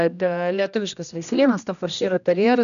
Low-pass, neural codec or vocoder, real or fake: 7.2 kHz; codec, 16 kHz, 1 kbps, X-Codec, HuBERT features, trained on general audio; fake